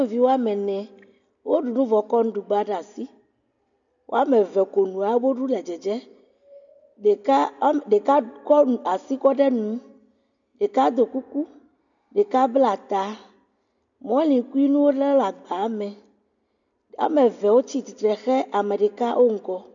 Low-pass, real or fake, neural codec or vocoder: 7.2 kHz; real; none